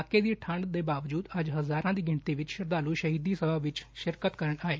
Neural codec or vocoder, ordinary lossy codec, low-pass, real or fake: none; none; none; real